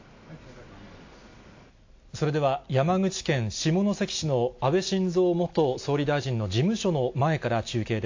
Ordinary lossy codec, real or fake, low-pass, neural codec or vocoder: AAC, 48 kbps; real; 7.2 kHz; none